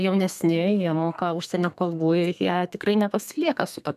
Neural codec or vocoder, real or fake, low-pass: codec, 32 kHz, 1.9 kbps, SNAC; fake; 14.4 kHz